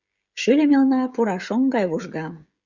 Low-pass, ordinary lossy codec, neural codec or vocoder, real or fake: 7.2 kHz; Opus, 64 kbps; codec, 16 kHz, 16 kbps, FreqCodec, smaller model; fake